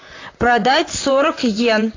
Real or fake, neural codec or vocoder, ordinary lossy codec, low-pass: fake; vocoder, 44.1 kHz, 128 mel bands, Pupu-Vocoder; AAC, 32 kbps; 7.2 kHz